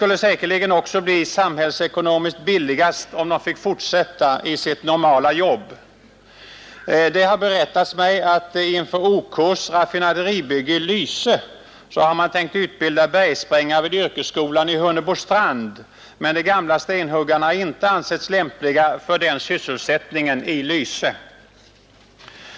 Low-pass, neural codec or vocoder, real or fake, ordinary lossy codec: none; none; real; none